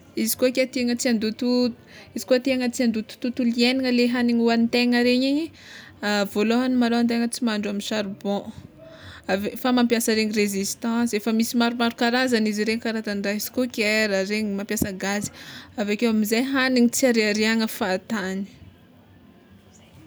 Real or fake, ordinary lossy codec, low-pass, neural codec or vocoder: real; none; none; none